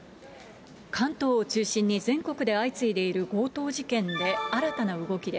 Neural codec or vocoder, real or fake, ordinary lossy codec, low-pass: none; real; none; none